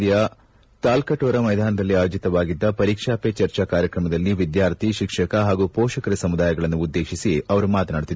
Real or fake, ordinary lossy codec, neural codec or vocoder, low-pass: real; none; none; none